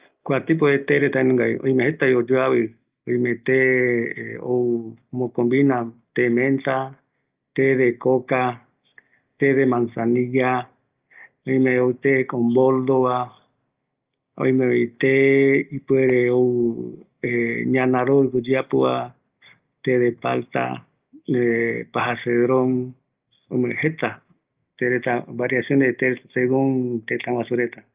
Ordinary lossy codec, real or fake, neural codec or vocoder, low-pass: Opus, 24 kbps; real; none; 3.6 kHz